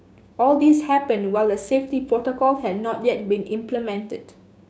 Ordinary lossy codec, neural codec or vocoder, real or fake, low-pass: none; codec, 16 kHz, 6 kbps, DAC; fake; none